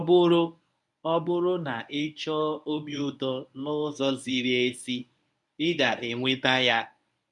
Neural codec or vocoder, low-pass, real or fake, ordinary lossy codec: codec, 24 kHz, 0.9 kbps, WavTokenizer, medium speech release version 1; 10.8 kHz; fake; none